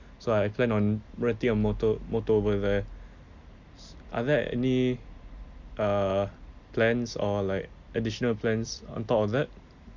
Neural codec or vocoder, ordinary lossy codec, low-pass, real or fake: none; Opus, 64 kbps; 7.2 kHz; real